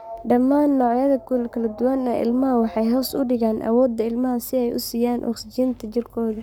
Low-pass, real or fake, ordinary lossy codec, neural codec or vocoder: none; fake; none; codec, 44.1 kHz, 7.8 kbps, Pupu-Codec